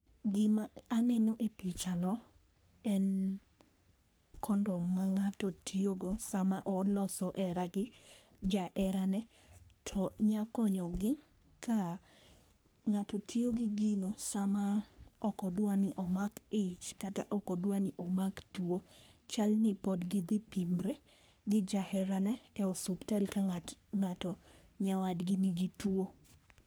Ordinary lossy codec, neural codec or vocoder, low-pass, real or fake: none; codec, 44.1 kHz, 3.4 kbps, Pupu-Codec; none; fake